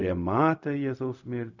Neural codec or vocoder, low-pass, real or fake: codec, 16 kHz, 0.4 kbps, LongCat-Audio-Codec; 7.2 kHz; fake